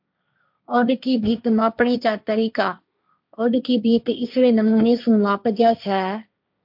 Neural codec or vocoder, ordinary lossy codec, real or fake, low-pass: codec, 16 kHz, 1.1 kbps, Voila-Tokenizer; MP3, 48 kbps; fake; 5.4 kHz